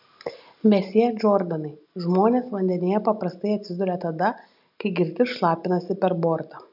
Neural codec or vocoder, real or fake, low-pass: none; real; 5.4 kHz